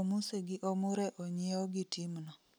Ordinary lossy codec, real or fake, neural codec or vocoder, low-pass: none; real; none; none